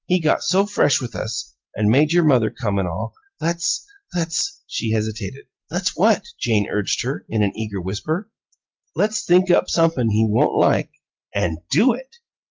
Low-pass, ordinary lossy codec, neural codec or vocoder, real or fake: 7.2 kHz; Opus, 24 kbps; none; real